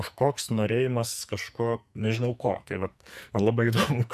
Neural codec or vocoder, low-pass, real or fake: codec, 44.1 kHz, 2.6 kbps, SNAC; 14.4 kHz; fake